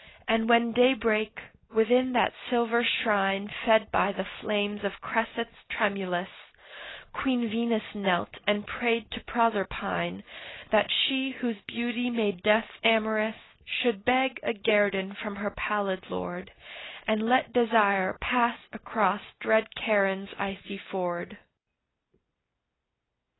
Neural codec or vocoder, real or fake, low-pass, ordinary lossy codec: none; real; 7.2 kHz; AAC, 16 kbps